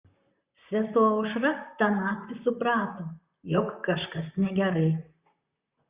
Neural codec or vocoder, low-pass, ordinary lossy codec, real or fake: vocoder, 44.1 kHz, 128 mel bands, Pupu-Vocoder; 3.6 kHz; Opus, 64 kbps; fake